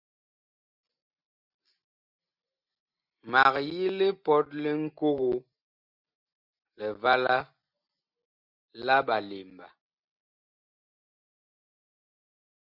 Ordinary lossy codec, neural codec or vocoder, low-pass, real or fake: Opus, 64 kbps; none; 5.4 kHz; real